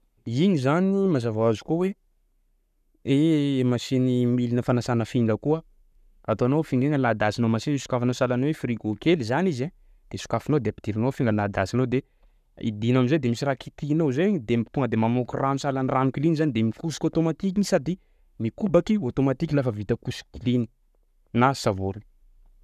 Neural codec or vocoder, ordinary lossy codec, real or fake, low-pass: codec, 44.1 kHz, 7.8 kbps, Pupu-Codec; none; fake; 14.4 kHz